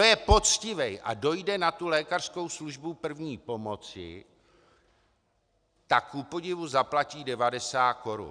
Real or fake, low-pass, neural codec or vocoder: real; 9.9 kHz; none